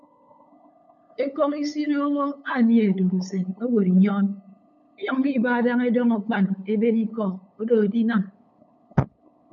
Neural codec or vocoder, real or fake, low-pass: codec, 16 kHz, 8 kbps, FunCodec, trained on LibriTTS, 25 frames a second; fake; 7.2 kHz